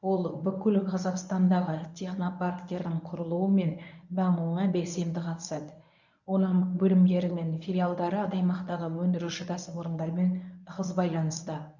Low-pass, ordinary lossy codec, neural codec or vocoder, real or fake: 7.2 kHz; none; codec, 24 kHz, 0.9 kbps, WavTokenizer, medium speech release version 1; fake